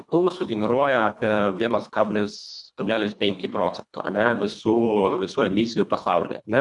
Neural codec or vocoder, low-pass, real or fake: codec, 24 kHz, 1.5 kbps, HILCodec; 10.8 kHz; fake